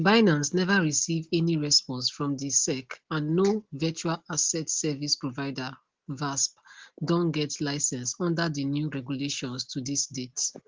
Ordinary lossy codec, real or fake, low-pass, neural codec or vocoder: Opus, 16 kbps; real; 7.2 kHz; none